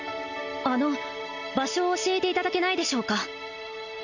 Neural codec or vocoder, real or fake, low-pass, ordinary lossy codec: none; real; 7.2 kHz; none